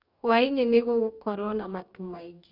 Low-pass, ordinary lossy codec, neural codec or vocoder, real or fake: 5.4 kHz; none; codec, 44.1 kHz, 2.6 kbps, DAC; fake